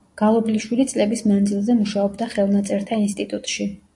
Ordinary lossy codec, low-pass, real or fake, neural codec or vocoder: AAC, 64 kbps; 10.8 kHz; real; none